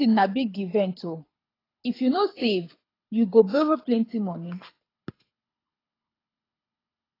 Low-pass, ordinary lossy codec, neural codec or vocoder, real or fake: 5.4 kHz; AAC, 24 kbps; codec, 24 kHz, 6 kbps, HILCodec; fake